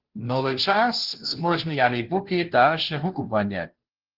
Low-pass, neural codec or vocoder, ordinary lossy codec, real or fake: 5.4 kHz; codec, 16 kHz, 0.5 kbps, FunCodec, trained on Chinese and English, 25 frames a second; Opus, 16 kbps; fake